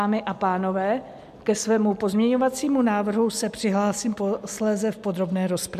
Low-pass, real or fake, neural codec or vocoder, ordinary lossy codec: 14.4 kHz; real; none; MP3, 96 kbps